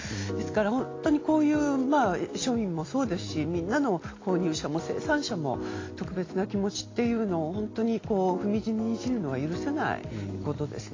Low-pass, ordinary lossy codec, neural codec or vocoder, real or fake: 7.2 kHz; AAC, 32 kbps; none; real